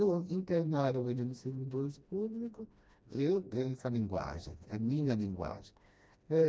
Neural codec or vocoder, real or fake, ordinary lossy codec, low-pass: codec, 16 kHz, 1 kbps, FreqCodec, smaller model; fake; none; none